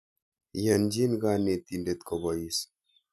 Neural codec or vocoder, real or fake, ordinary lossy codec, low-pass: none; real; none; 14.4 kHz